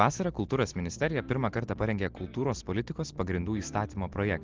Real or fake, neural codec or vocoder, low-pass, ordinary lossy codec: real; none; 7.2 kHz; Opus, 16 kbps